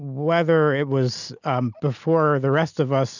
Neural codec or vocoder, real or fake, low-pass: none; real; 7.2 kHz